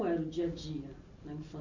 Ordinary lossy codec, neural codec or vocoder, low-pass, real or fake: Opus, 64 kbps; none; 7.2 kHz; real